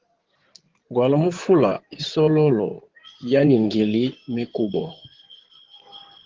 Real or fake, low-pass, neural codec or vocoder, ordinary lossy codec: fake; 7.2 kHz; codec, 16 kHz in and 24 kHz out, 2.2 kbps, FireRedTTS-2 codec; Opus, 16 kbps